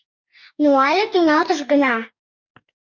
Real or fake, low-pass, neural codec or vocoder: fake; 7.2 kHz; codec, 44.1 kHz, 2.6 kbps, DAC